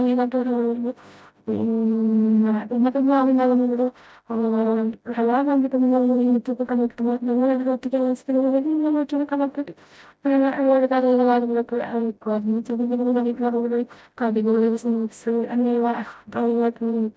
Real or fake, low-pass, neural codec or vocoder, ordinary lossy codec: fake; none; codec, 16 kHz, 0.5 kbps, FreqCodec, smaller model; none